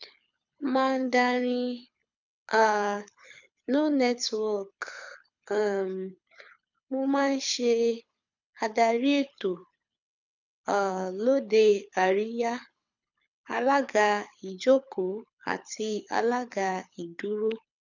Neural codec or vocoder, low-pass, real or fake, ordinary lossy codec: codec, 24 kHz, 6 kbps, HILCodec; 7.2 kHz; fake; none